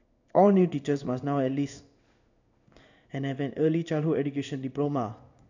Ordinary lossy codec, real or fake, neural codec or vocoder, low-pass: none; fake; codec, 16 kHz in and 24 kHz out, 1 kbps, XY-Tokenizer; 7.2 kHz